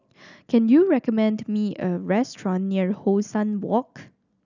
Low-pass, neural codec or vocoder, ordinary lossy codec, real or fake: 7.2 kHz; none; none; real